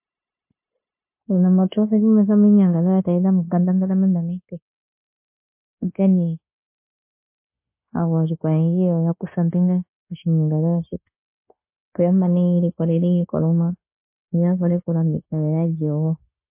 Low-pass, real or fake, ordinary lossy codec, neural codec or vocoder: 3.6 kHz; fake; MP3, 24 kbps; codec, 16 kHz, 0.9 kbps, LongCat-Audio-Codec